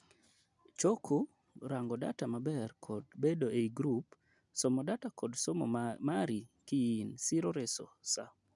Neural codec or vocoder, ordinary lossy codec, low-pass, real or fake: none; none; 10.8 kHz; real